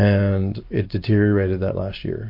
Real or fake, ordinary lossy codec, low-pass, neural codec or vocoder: real; MP3, 32 kbps; 5.4 kHz; none